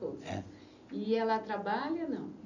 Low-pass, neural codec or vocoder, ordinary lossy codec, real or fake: 7.2 kHz; none; none; real